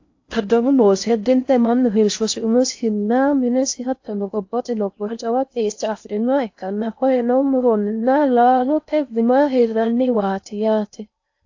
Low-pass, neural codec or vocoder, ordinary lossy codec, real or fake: 7.2 kHz; codec, 16 kHz in and 24 kHz out, 0.6 kbps, FocalCodec, streaming, 4096 codes; AAC, 48 kbps; fake